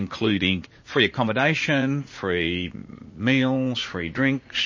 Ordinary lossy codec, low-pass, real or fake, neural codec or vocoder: MP3, 32 kbps; 7.2 kHz; fake; vocoder, 44.1 kHz, 80 mel bands, Vocos